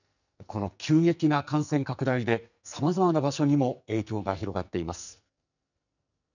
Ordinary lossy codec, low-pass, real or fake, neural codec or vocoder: none; 7.2 kHz; fake; codec, 44.1 kHz, 2.6 kbps, SNAC